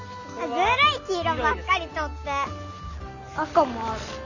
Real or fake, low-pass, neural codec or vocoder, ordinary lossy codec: real; 7.2 kHz; none; none